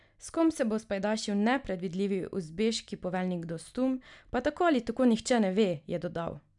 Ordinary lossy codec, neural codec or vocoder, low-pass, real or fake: none; none; 10.8 kHz; real